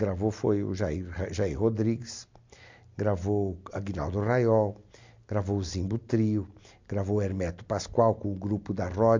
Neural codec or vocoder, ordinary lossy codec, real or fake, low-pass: none; MP3, 64 kbps; real; 7.2 kHz